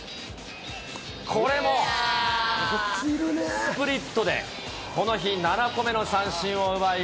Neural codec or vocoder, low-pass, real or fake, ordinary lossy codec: none; none; real; none